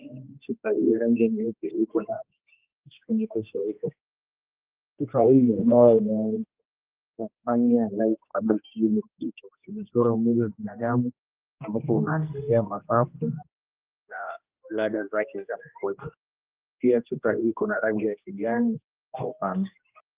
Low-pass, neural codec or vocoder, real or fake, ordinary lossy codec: 3.6 kHz; codec, 16 kHz, 1 kbps, X-Codec, HuBERT features, trained on general audio; fake; Opus, 32 kbps